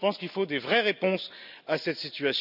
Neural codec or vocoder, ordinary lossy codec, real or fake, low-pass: none; none; real; 5.4 kHz